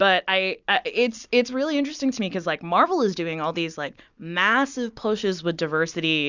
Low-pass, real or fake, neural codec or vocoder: 7.2 kHz; real; none